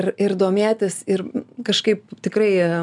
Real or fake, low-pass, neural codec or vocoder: real; 10.8 kHz; none